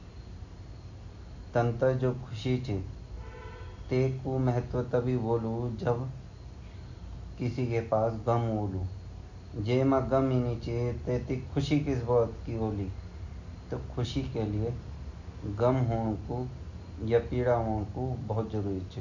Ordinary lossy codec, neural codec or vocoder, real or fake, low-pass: none; none; real; 7.2 kHz